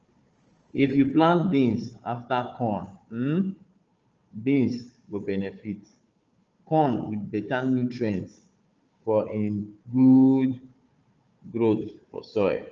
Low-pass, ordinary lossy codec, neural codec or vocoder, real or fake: 7.2 kHz; Opus, 24 kbps; codec, 16 kHz, 4 kbps, FunCodec, trained on Chinese and English, 50 frames a second; fake